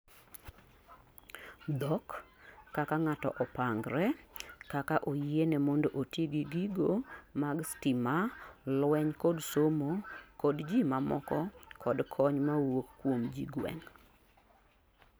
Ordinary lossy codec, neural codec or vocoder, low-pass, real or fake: none; none; none; real